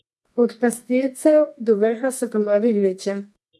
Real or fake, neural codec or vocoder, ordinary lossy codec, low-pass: fake; codec, 24 kHz, 0.9 kbps, WavTokenizer, medium music audio release; none; none